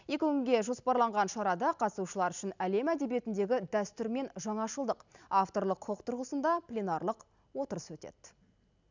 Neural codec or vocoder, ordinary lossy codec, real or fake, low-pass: none; none; real; 7.2 kHz